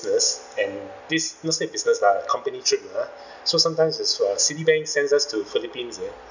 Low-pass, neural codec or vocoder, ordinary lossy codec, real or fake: 7.2 kHz; none; none; real